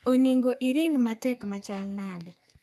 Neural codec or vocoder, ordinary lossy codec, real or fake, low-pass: codec, 32 kHz, 1.9 kbps, SNAC; none; fake; 14.4 kHz